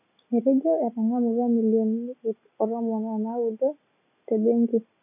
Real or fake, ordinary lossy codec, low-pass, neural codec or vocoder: real; none; 3.6 kHz; none